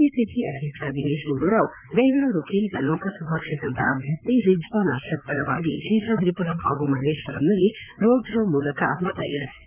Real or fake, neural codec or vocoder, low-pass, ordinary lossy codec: fake; codec, 16 kHz, 4 kbps, FreqCodec, larger model; 3.6 kHz; none